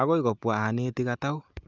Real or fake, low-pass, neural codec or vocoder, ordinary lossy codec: real; 7.2 kHz; none; Opus, 24 kbps